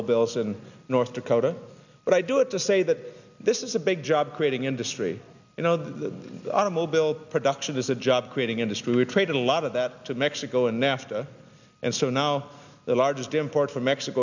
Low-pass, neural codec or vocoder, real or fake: 7.2 kHz; none; real